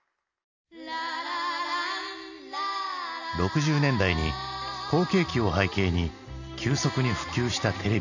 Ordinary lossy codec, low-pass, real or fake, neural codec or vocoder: none; 7.2 kHz; real; none